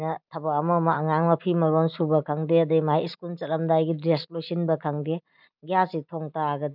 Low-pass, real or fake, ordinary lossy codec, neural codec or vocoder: 5.4 kHz; real; none; none